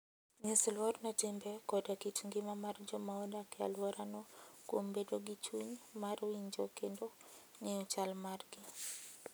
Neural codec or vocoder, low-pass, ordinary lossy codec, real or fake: none; none; none; real